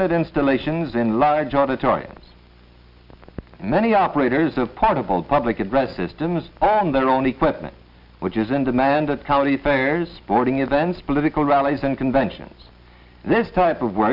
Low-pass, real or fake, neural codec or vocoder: 5.4 kHz; real; none